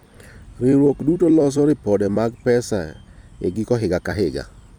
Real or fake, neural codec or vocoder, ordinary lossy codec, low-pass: fake; vocoder, 44.1 kHz, 128 mel bands every 256 samples, BigVGAN v2; none; 19.8 kHz